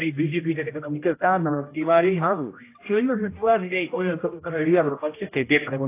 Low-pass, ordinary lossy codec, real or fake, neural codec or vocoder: 3.6 kHz; AAC, 24 kbps; fake; codec, 16 kHz, 0.5 kbps, X-Codec, HuBERT features, trained on general audio